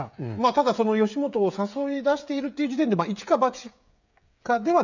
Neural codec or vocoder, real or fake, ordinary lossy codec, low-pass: codec, 16 kHz, 16 kbps, FreqCodec, smaller model; fake; none; 7.2 kHz